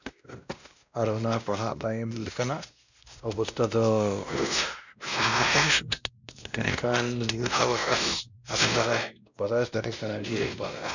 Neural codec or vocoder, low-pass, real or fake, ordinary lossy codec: codec, 16 kHz, 1 kbps, X-Codec, WavLM features, trained on Multilingual LibriSpeech; 7.2 kHz; fake; none